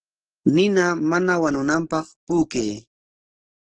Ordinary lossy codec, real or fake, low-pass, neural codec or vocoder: Opus, 16 kbps; fake; 9.9 kHz; codec, 44.1 kHz, 7.8 kbps, DAC